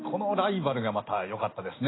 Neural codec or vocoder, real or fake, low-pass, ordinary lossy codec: none; real; 7.2 kHz; AAC, 16 kbps